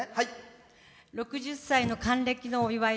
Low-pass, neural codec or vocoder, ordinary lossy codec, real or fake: none; none; none; real